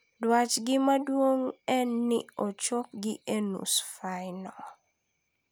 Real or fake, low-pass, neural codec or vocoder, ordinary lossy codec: real; none; none; none